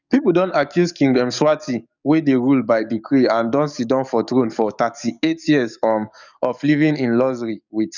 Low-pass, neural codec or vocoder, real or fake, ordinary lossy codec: 7.2 kHz; codec, 44.1 kHz, 7.8 kbps, DAC; fake; none